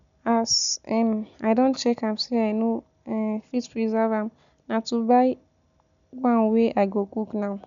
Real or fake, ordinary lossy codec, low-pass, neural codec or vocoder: real; none; 7.2 kHz; none